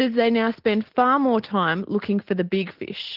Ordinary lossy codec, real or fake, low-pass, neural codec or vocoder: Opus, 16 kbps; real; 5.4 kHz; none